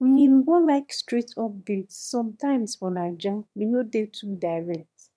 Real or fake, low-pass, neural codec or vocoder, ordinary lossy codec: fake; none; autoencoder, 22.05 kHz, a latent of 192 numbers a frame, VITS, trained on one speaker; none